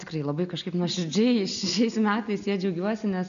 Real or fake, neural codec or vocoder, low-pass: real; none; 7.2 kHz